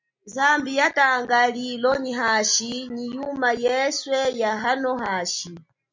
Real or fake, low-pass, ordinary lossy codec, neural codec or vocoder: real; 7.2 kHz; MP3, 64 kbps; none